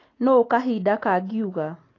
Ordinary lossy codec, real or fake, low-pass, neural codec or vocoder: AAC, 32 kbps; real; 7.2 kHz; none